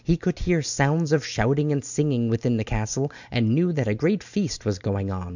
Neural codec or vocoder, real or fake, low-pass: none; real; 7.2 kHz